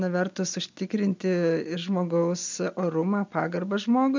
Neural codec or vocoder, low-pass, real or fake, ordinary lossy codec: none; 7.2 kHz; real; MP3, 64 kbps